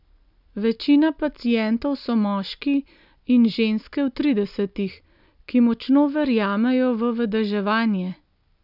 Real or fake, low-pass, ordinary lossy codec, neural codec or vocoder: real; 5.4 kHz; none; none